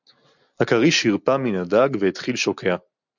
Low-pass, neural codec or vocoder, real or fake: 7.2 kHz; none; real